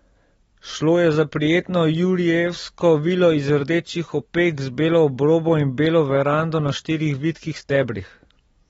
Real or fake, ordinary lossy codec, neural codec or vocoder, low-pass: real; AAC, 24 kbps; none; 19.8 kHz